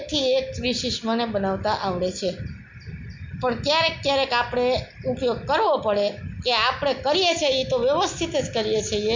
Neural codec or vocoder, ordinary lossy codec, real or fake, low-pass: none; MP3, 64 kbps; real; 7.2 kHz